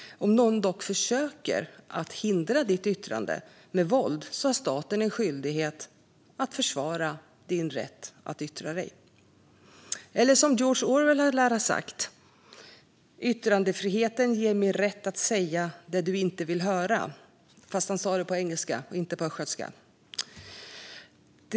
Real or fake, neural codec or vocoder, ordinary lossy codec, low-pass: real; none; none; none